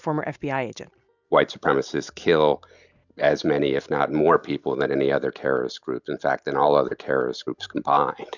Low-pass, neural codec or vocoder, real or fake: 7.2 kHz; none; real